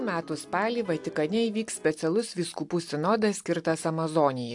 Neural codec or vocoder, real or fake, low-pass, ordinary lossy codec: none; real; 10.8 kHz; AAC, 64 kbps